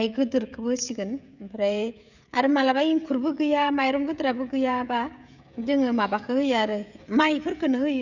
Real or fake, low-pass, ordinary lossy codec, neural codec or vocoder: fake; 7.2 kHz; none; codec, 16 kHz, 16 kbps, FreqCodec, smaller model